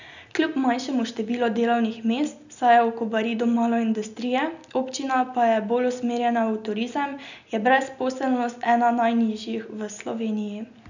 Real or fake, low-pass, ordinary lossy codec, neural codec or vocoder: real; 7.2 kHz; none; none